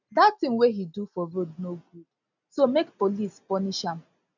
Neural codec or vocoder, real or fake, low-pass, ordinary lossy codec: none; real; 7.2 kHz; none